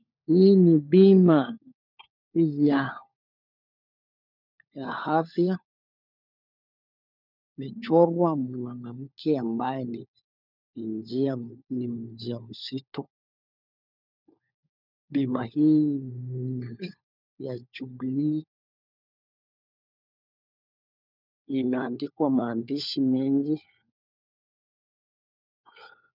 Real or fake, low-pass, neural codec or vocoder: fake; 5.4 kHz; codec, 16 kHz, 4 kbps, FunCodec, trained on LibriTTS, 50 frames a second